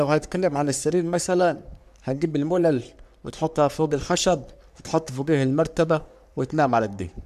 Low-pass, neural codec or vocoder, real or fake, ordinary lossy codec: 14.4 kHz; codec, 44.1 kHz, 3.4 kbps, Pupu-Codec; fake; none